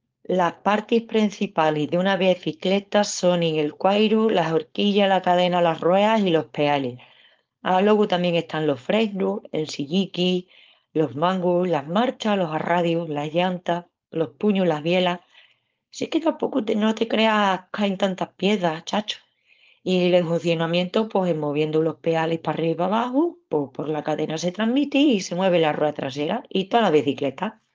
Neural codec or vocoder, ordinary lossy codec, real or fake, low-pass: codec, 16 kHz, 4.8 kbps, FACodec; Opus, 24 kbps; fake; 7.2 kHz